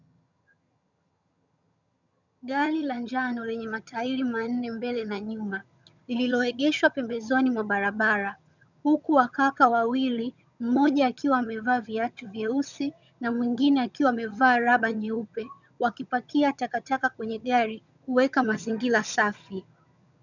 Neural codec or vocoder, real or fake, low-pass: vocoder, 22.05 kHz, 80 mel bands, HiFi-GAN; fake; 7.2 kHz